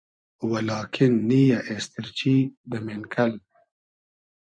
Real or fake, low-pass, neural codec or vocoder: fake; 9.9 kHz; vocoder, 24 kHz, 100 mel bands, Vocos